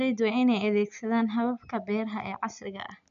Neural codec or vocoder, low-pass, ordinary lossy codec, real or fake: none; 7.2 kHz; none; real